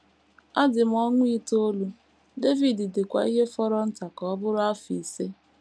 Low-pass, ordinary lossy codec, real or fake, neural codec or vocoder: none; none; real; none